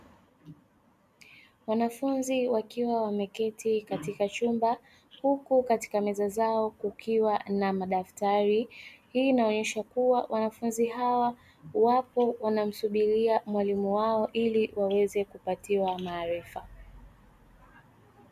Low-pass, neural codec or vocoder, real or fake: 14.4 kHz; none; real